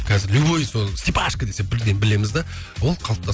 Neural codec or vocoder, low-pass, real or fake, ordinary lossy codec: none; none; real; none